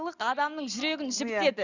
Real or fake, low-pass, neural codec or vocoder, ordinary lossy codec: real; 7.2 kHz; none; none